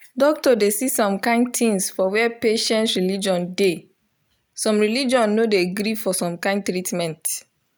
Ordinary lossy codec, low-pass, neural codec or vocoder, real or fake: none; none; none; real